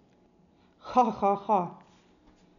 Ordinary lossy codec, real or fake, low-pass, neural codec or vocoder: none; real; 7.2 kHz; none